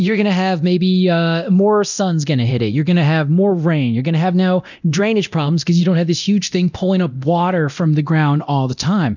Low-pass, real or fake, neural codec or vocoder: 7.2 kHz; fake; codec, 24 kHz, 0.9 kbps, DualCodec